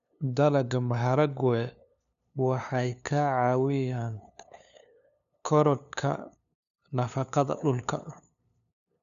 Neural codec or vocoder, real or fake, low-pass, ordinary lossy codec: codec, 16 kHz, 2 kbps, FunCodec, trained on LibriTTS, 25 frames a second; fake; 7.2 kHz; none